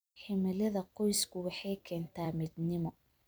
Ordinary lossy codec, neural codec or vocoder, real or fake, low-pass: none; vocoder, 44.1 kHz, 128 mel bands every 256 samples, BigVGAN v2; fake; none